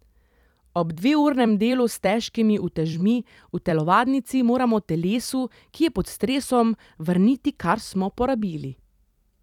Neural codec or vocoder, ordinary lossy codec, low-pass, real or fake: vocoder, 44.1 kHz, 128 mel bands every 256 samples, BigVGAN v2; none; 19.8 kHz; fake